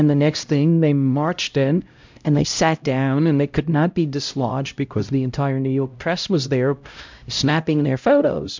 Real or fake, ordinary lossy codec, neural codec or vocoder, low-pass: fake; MP3, 64 kbps; codec, 16 kHz, 0.5 kbps, X-Codec, HuBERT features, trained on LibriSpeech; 7.2 kHz